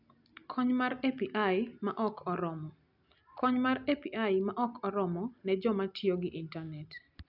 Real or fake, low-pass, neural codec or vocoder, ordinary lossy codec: real; 5.4 kHz; none; none